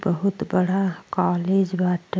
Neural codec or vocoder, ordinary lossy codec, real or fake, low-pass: none; none; real; none